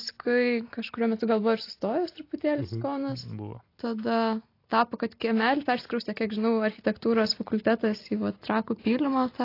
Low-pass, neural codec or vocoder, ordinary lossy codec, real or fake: 5.4 kHz; none; AAC, 32 kbps; real